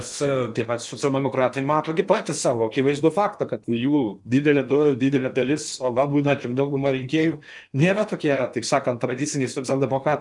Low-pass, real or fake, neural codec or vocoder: 10.8 kHz; fake; codec, 16 kHz in and 24 kHz out, 0.8 kbps, FocalCodec, streaming, 65536 codes